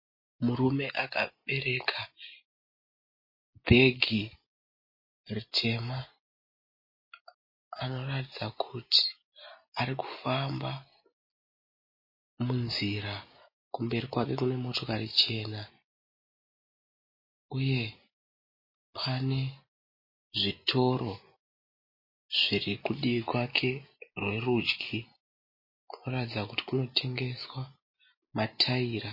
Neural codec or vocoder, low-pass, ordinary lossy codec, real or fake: none; 5.4 kHz; MP3, 24 kbps; real